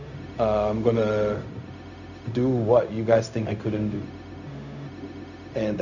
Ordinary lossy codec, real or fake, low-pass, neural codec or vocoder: none; fake; 7.2 kHz; codec, 16 kHz, 0.4 kbps, LongCat-Audio-Codec